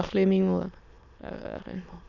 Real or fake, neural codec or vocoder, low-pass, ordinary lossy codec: fake; autoencoder, 22.05 kHz, a latent of 192 numbers a frame, VITS, trained on many speakers; 7.2 kHz; none